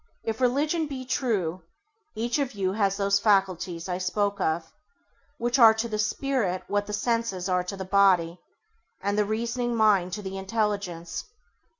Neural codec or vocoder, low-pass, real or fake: none; 7.2 kHz; real